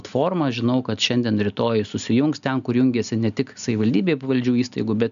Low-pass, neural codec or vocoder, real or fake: 7.2 kHz; none; real